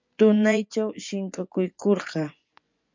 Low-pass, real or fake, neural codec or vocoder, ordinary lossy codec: 7.2 kHz; fake; vocoder, 24 kHz, 100 mel bands, Vocos; MP3, 64 kbps